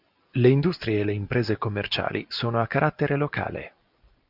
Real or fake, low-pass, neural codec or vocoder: real; 5.4 kHz; none